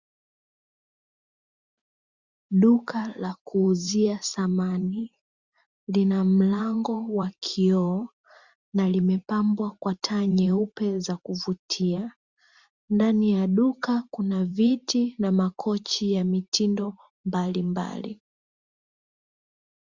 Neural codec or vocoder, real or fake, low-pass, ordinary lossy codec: vocoder, 44.1 kHz, 128 mel bands every 512 samples, BigVGAN v2; fake; 7.2 kHz; Opus, 64 kbps